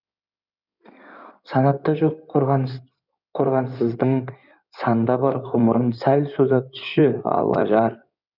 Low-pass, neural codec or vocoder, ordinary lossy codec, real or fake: 5.4 kHz; codec, 16 kHz in and 24 kHz out, 2.2 kbps, FireRedTTS-2 codec; none; fake